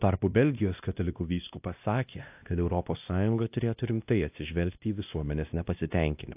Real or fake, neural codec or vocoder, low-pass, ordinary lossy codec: fake; codec, 16 kHz, 1 kbps, X-Codec, WavLM features, trained on Multilingual LibriSpeech; 3.6 kHz; AAC, 32 kbps